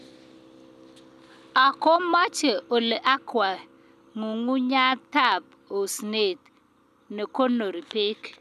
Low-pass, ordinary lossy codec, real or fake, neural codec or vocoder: 14.4 kHz; none; real; none